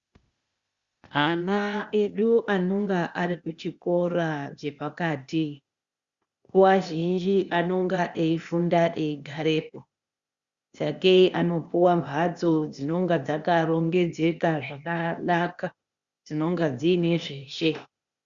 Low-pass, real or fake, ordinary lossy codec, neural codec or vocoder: 7.2 kHz; fake; Opus, 64 kbps; codec, 16 kHz, 0.8 kbps, ZipCodec